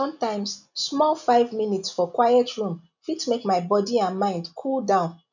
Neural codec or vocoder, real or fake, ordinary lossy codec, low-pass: none; real; none; 7.2 kHz